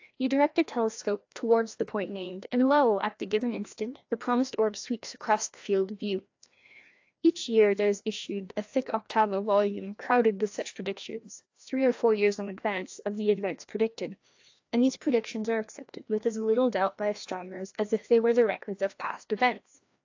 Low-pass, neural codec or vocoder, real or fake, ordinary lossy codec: 7.2 kHz; codec, 16 kHz, 1 kbps, FreqCodec, larger model; fake; AAC, 48 kbps